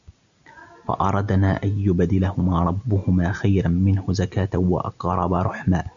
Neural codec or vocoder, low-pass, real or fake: none; 7.2 kHz; real